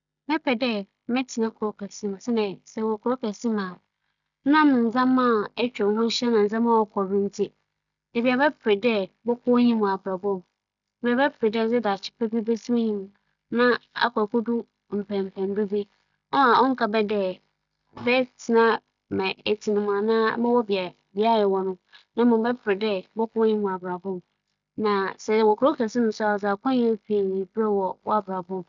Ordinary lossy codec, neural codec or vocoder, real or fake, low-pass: none; none; real; 7.2 kHz